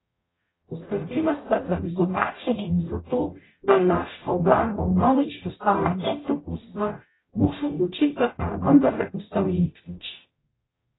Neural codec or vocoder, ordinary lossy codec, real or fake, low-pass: codec, 44.1 kHz, 0.9 kbps, DAC; AAC, 16 kbps; fake; 7.2 kHz